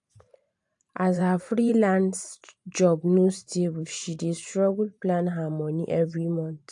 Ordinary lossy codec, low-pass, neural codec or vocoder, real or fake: AAC, 64 kbps; 10.8 kHz; vocoder, 44.1 kHz, 128 mel bands every 512 samples, BigVGAN v2; fake